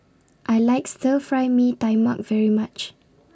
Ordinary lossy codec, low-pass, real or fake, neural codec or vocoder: none; none; real; none